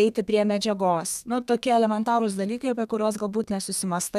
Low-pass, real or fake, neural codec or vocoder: 14.4 kHz; fake; codec, 32 kHz, 1.9 kbps, SNAC